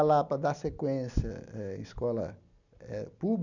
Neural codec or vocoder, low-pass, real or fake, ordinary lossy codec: none; 7.2 kHz; real; none